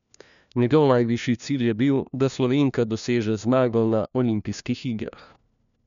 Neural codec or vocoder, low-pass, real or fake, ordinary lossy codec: codec, 16 kHz, 1 kbps, FunCodec, trained on LibriTTS, 50 frames a second; 7.2 kHz; fake; none